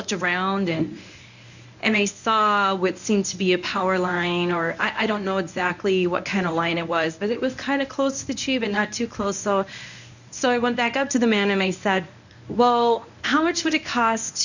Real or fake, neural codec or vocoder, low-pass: fake; codec, 24 kHz, 0.9 kbps, WavTokenizer, medium speech release version 1; 7.2 kHz